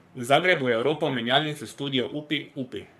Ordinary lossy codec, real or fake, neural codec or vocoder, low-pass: none; fake; codec, 44.1 kHz, 3.4 kbps, Pupu-Codec; 14.4 kHz